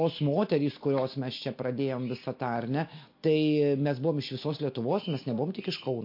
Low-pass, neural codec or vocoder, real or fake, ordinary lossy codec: 5.4 kHz; none; real; MP3, 32 kbps